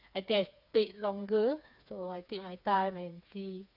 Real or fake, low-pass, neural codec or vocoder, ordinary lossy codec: fake; 5.4 kHz; codec, 16 kHz, 4 kbps, FreqCodec, smaller model; none